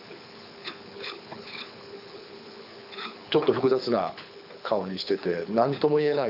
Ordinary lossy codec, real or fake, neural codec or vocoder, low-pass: none; fake; codec, 24 kHz, 6 kbps, HILCodec; 5.4 kHz